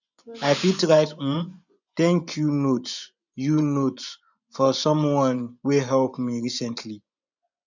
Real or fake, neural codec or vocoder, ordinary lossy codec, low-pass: fake; vocoder, 24 kHz, 100 mel bands, Vocos; none; 7.2 kHz